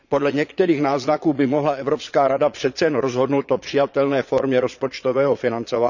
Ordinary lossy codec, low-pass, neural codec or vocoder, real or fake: none; 7.2 kHz; vocoder, 22.05 kHz, 80 mel bands, Vocos; fake